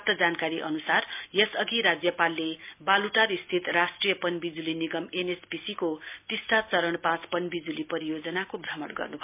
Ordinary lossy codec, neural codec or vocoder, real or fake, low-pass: MP3, 32 kbps; none; real; 3.6 kHz